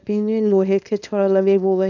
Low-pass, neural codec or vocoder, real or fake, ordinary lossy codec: 7.2 kHz; codec, 24 kHz, 0.9 kbps, WavTokenizer, small release; fake; none